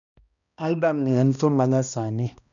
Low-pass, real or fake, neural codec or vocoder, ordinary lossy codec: 7.2 kHz; fake; codec, 16 kHz, 1 kbps, X-Codec, HuBERT features, trained on balanced general audio; none